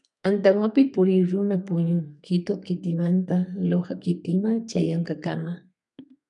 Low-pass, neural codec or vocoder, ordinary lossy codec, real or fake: 10.8 kHz; codec, 32 kHz, 1.9 kbps, SNAC; MP3, 96 kbps; fake